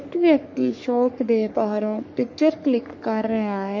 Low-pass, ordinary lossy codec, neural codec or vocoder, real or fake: 7.2 kHz; MP3, 48 kbps; codec, 44.1 kHz, 3.4 kbps, Pupu-Codec; fake